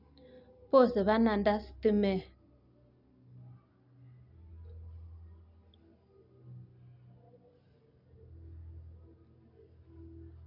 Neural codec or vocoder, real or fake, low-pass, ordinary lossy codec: none; real; 5.4 kHz; none